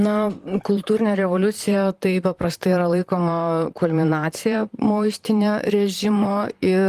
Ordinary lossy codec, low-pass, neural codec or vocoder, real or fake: Opus, 24 kbps; 14.4 kHz; vocoder, 44.1 kHz, 128 mel bands, Pupu-Vocoder; fake